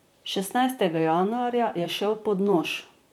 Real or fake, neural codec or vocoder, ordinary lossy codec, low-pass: fake; vocoder, 44.1 kHz, 128 mel bands, Pupu-Vocoder; none; 19.8 kHz